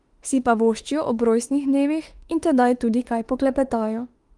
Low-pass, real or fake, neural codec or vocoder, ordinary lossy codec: 10.8 kHz; fake; autoencoder, 48 kHz, 32 numbers a frame, DAC-VAE, trained on Japanese speech; Opus, 24 kbps